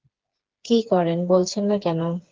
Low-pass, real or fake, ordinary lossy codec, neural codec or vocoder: 7.2 kHz; fake; Opus, 16 kbps; codec, 16 kHz, 4 kbps, FreqCodec, smaller model